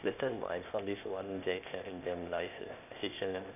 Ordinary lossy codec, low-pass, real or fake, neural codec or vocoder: none; 3.6 kHz; fake; codec, 16 kHz, 0.8 kbps, ZipCodec